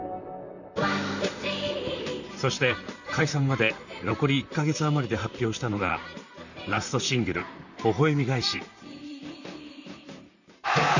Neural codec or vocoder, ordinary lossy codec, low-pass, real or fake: vocoder, 44.1 kHz, 128 mel bands, Pupu-Vocoder; none; 7.2 kHz; fake